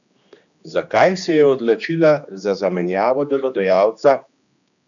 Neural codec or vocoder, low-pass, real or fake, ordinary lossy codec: codec, 16 kHz, 2 kbps, X-Codec, HuBERT features, trained on general audio; 7.2 kHz; fake; none